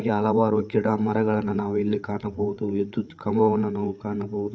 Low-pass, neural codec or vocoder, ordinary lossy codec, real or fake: none; codec, 16 kHz, 8 kbps, FreqCodec, larger model; none; fake